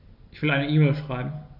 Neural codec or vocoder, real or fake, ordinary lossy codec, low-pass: none; real; none; 5.4 kHz